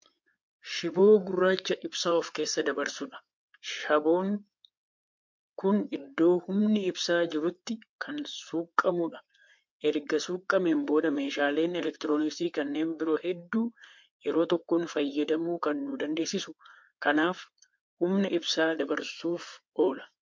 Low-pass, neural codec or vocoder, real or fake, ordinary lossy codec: 7.2 kHz; codec, 16 kHz in and 24 kHz out, 2.2 kbps, FireRedTTS-2 codec; fake; MP3, 48 kbps